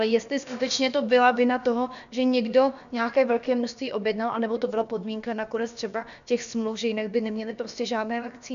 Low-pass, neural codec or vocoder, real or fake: 7.2 kHz; codec, 16 kHz, about 1 kbps, DyCAST, with the encoder's durations; fake